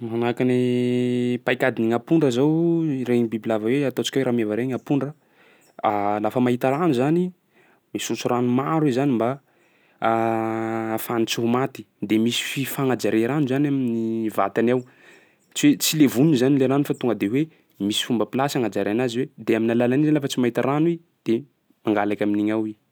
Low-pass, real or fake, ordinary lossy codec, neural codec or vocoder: none; real; none; none